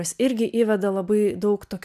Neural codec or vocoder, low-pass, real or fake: none; 14.4 kHz; real